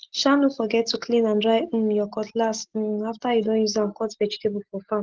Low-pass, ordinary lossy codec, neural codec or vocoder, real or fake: 7.2 kHz; Opus, 16 kbps; none; real